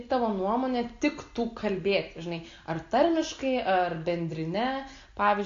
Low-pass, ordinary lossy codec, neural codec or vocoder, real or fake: 7.2 kHz; AAC, 48 kbps; none; real